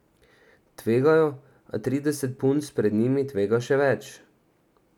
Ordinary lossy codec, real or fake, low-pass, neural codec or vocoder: none; real; 19.8 kHz; none